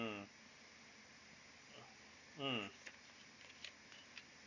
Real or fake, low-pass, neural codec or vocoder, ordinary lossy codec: real; 7.2 kHz; none; Opus, 64 kbps